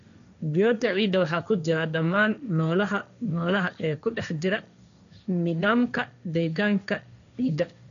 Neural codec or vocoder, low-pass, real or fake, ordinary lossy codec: codec, 16 kHz, 1.1 kbps, Voila-Tokenizer; 7.2 kHz; fake; none